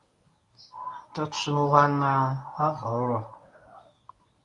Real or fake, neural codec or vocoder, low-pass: fake; codec, 24 kHz, 0.9 kbps, WavTokenizer, medium speech release version 1; 10.8 kHz